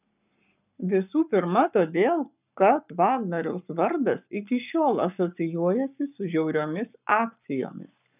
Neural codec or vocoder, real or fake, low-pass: codec, 44.1 kHz, 7.8 kbps, Pupu-Codec; fake; 3.6 kHz